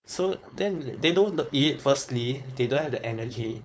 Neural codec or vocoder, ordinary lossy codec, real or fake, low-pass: codec, 16 kHz, 4.8 kbps, FACodec; none; fake; none